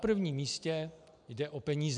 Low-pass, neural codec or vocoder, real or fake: 9.9 kHz; none; real